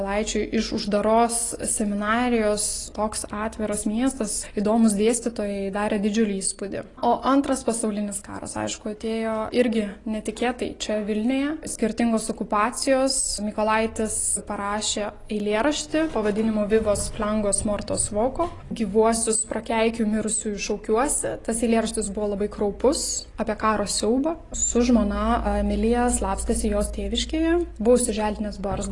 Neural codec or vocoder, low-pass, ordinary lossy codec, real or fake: none; 10.8 kHz; AAC, 32 kbps; real